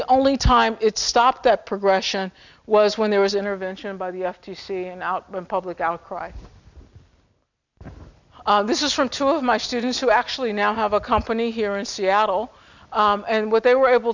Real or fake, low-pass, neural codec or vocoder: real; 7.2 kHz; none